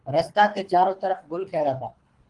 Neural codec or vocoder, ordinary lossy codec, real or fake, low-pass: codec, 24 kHz, 3 kbps, HILCodec; Opus, 24 kbps; fake; 10.8 kHz